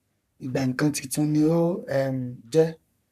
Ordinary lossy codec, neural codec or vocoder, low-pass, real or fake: none; codec, 44.1 kHz, 3.4 kbps, Pupu-Codec; 14.4 kHz; fake